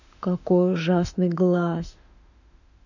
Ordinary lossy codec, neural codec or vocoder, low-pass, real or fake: none; autoencoder, 48 kHz, 32 numbers a frame, DAC-VAE, trained on Japanese speech; 7.2 kHz; fake